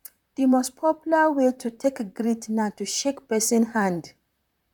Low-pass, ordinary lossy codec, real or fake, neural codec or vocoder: 19.8 kHz; none; fake; vocoder, 44.1 kHz, 128 mel bands, Pupu-Vocoder